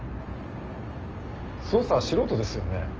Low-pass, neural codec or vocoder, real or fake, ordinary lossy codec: 7.2 kHz; none; real; Opus, 24 kbps